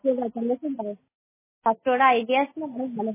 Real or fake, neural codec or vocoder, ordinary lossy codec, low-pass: real; none; MP3, 16 kbps; 3.6 kHz